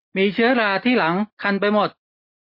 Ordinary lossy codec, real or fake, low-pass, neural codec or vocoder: MP3, 32 kbps; real; 5.4 kHz; none